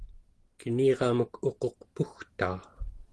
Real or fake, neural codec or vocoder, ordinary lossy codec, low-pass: real; none; Opus, 16 kbps; 10.8 kHz